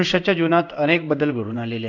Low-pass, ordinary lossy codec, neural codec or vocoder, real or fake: 7.2 kHz; none; codec, 16 kHz in and 24 kHz out, 1 kbps, XY-Tokenizer; fake